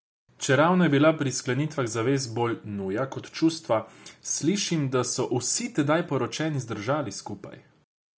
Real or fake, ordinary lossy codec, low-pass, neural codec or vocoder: real; none; none; none